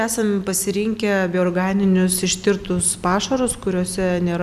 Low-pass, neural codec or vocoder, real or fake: 14.4 kHz; none; real